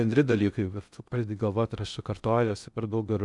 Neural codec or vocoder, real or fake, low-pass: codec, 16 kHz in and 24 kHz out, 0.6 kbps, FocalCodec, streaming, 2048 codes; fake; 10.8 kHz